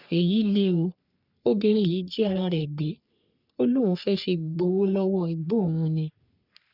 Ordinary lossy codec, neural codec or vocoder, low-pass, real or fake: none; codec, 44.1 kHz, 3.4 kbps, Pupu-Codec; 5.4 kHz; fake